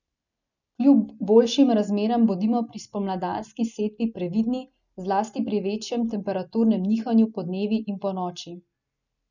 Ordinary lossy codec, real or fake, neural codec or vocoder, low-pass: none; real; none; 7.2 kHz